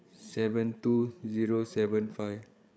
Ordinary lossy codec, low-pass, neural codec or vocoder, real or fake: none; none; codec, 16 kHz, 8 kbps, FreqCodec, larger model; fake